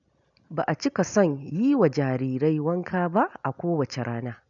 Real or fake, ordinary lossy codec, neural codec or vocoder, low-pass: real; none; none; 7.2 kHz